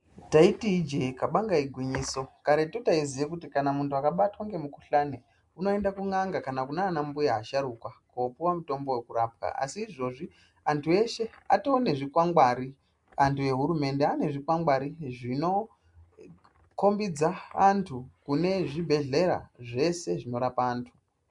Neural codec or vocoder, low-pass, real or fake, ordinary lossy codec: none; 10.8 kHz; real; MP3, 64 kbps